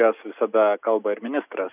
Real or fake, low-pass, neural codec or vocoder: real; 3.6 kHz; none